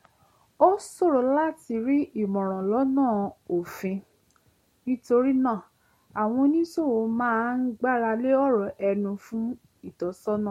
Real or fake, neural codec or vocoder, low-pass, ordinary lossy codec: real; none; 19.8 kHz; MP3, 64 kbps